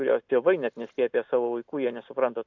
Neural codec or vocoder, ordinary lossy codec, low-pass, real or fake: none; MP3, 64 kbps; 7.2 kHz; real